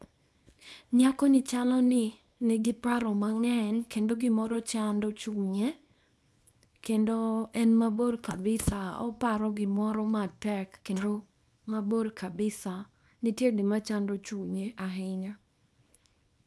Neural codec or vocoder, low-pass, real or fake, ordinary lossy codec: codec, 24 kHz, 0.9 kbps, WavTokenizer, small release; none; fake; none